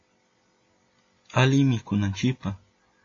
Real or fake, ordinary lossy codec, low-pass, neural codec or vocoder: real; AAC, 32 kbps; 7.2 kHz; none